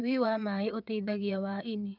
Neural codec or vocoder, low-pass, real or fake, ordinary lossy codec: vocoder, 22.05 kHz, 80 mel bands, WaveNeXt; 5.4 kHz; fake; none